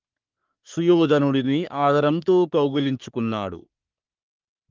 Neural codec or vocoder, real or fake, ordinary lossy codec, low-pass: codec, 44.1 kHz, 3.4 kbps, Pupu-Codec; fake; Opus, 32 kbps; 7.2 kHz